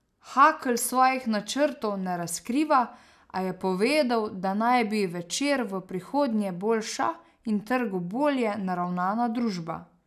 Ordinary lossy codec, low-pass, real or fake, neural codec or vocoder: none; 14.4 kHz; real; none